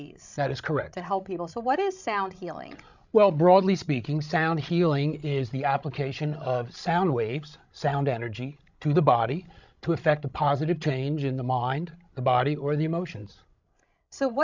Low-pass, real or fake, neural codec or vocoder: 7.2 kHz; fake; codec, 16 kHz, 16 kbps, FreqCodec, larger model